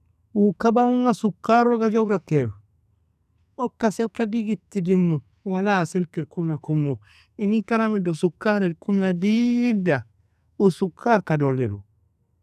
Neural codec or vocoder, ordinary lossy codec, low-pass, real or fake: codec, 44.1 kHz, 2.6 kbps, SNAC; none; 14.4 kHz; fake